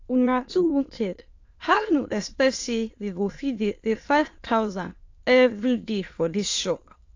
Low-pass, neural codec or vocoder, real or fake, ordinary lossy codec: 7.2 kHz; autoencoder, 22.05 kHz, a latent of 192 numbers a frame, VITS, trained on many speakers; fake; AAC, 48 kbps